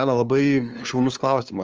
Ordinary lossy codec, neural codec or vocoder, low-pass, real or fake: Opus, 24 kbps; codec, 16 kHz, 2 kbps, FunCodec, trained on LibriTTS, 25 frames a second; 7.2 kHz; fake